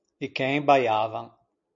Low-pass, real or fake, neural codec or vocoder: 7.2 kHz; real; none